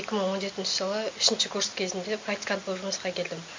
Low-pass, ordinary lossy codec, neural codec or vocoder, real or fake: 7.2 kHz; MP3, 64 kbps; none; real